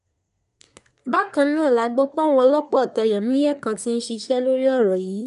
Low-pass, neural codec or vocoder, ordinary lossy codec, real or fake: 10.8 kHz; codec, 24 kHz, 1 kbps, SNAC; none; fake